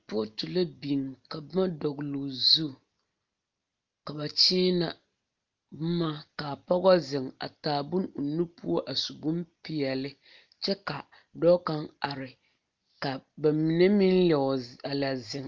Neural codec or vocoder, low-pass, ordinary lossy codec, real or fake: none; 7.2 kHz; Opus, 24 kbps; real